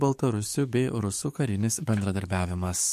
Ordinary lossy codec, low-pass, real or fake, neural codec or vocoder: MP3, 64 kbps; 14.4 kHz; fake; codec, 44.1 kHz, 7.8 kbps, DAC